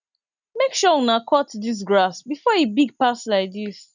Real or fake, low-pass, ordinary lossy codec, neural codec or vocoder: real; 7.2 kHz; none; none